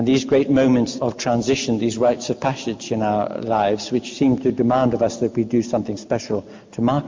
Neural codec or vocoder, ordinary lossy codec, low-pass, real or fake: none; MP3, 48 kbps; 7.2 kHz; real